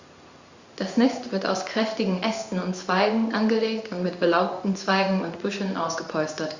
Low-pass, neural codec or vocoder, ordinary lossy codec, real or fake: 7.2 kHz; codec, 16 kHz in and 24 kHz out, 1 kbps, XY-Tokenizer; Opus, 64 kbps; fake